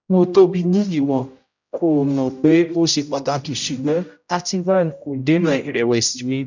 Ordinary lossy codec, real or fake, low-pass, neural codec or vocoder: none; fake; 7.2 kHz; codec, 16 kHz, 0.5 kbps, X-Codec, HuBERT features, trained on general audio